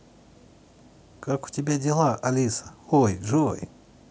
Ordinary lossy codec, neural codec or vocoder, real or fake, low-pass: none; none; real; none